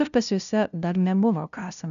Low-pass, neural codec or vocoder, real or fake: 7.2 kHz; codec, 16 kHz, 0.5 kbps, FunCodec, trained on LibriTTS, 25 frames a second; fake